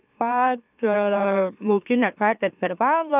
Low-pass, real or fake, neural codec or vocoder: 3.6 kHz; fake; autoencoder, 44.1 kHz, a latent of 192 numbers a frame, MeloTTS